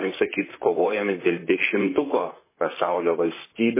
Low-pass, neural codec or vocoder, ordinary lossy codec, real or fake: 3.6 kHz; vocoder, 44.1 kHz, 128 mel bands, Pupu-Vocoder; MP3, 16 kbps; fake